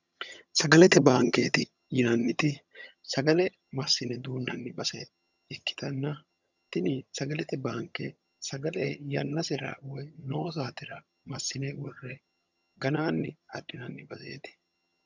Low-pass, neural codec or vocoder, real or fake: 7.2 kHz; vocoder, 22.05 kHz, 80 mel bands, HiFi-GAN; fake